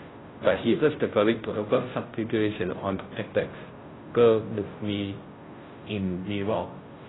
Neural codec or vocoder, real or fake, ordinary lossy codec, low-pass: codec, 16 kHz, 0.5 kbps, FunCodec, trained on Chinese and English, 25 frames a second; fake; AAC, 16 kbps; 7.2 kHz